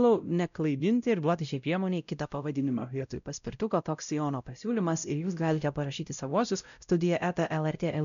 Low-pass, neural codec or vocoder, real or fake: 7.2 kHz; codec, 16 kHz, 0.5 kbps, X-Codec, WavLM features, trained on Multilingual LibriSpeech; fake